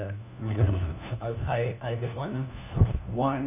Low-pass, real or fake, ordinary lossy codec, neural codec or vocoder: 3.6 kHz; fake; none; codec, 16 kHz, 1 kbps, FunCodec, trained on LibriTTS, 50 frames a second